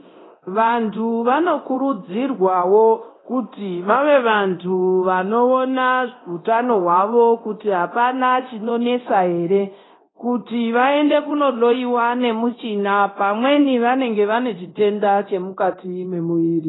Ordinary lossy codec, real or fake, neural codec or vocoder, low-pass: AAC, 16 kbps; fake; codec, 24 kHz, 0.9 kbps, DualCodec; 7.2 kHz